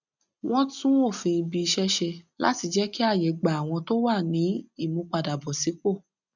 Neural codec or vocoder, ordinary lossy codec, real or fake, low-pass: none; none; real; 7.2 kHz